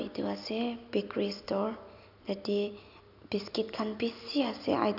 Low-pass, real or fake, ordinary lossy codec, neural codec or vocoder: 5.4 kHz; real; none; none